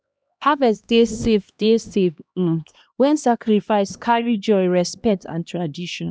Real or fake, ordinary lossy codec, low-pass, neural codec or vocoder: fake; none; none; codec, 16 kHz, 1 kbps, X-Codec, HuBERT features, trained on LibriSpeech